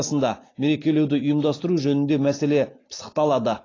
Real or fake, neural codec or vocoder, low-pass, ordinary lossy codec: real; none; 7.2 kHz; AAC, 32 kbps